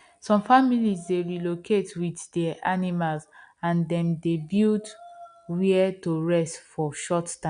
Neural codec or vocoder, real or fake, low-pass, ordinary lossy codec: none; real; 9.9 kHz; none